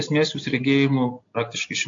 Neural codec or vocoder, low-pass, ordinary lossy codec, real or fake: none; 7.2 kHz; AAC, 48 kbps; real